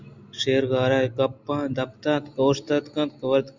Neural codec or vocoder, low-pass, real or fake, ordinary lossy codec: none; 7.2 kHz; real; Opus, 64 kbps